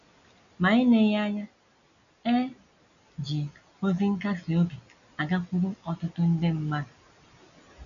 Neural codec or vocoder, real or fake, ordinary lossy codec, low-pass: none; real; none; 7.2 kHz